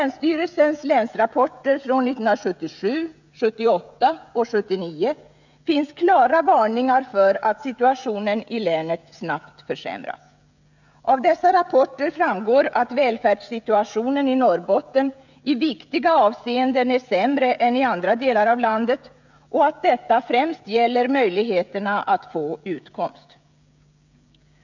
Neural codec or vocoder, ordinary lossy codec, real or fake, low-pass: codec, 16 kHz, 16 kbps, FreqCodec, smaller model; none; fake; 7.2 kHz